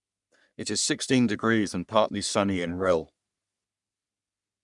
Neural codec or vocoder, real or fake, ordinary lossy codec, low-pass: codec, 44.1 kHz, 3.4 kbps, Pupu-Codec; fake; none; 10.8 kHz